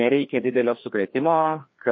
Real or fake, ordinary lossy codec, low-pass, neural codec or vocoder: fake; MP3, 32 kbps; 7.2 kHz; codec, 16 kHz, 2 kbps, FreqCodec, larger model